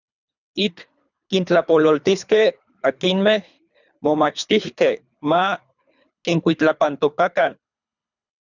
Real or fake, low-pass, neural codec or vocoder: fake; 7.2 kHz; codec, 24 kHz, 3 kbps, HILCodec